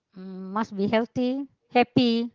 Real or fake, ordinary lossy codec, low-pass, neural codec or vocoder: real; Opus, 16 kbps; 7.2 kHz; none